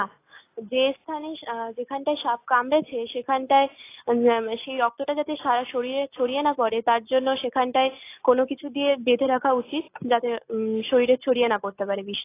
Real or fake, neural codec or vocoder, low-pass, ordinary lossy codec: real; none; 3.6 kHz; AAC, 24 kbps